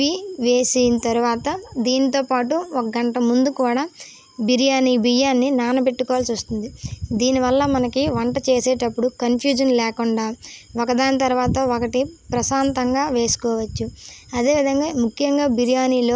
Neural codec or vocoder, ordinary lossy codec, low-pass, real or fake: none; none; none; real